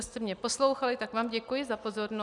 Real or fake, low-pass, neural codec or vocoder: real; 10.8 kHz; none